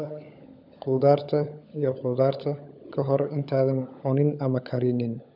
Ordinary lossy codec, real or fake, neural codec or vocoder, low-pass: none; fake; codec, 16 kHz, 16 kbps, FunCodec, trained on LibriTTS, 50 frames a second; 5.4 kHz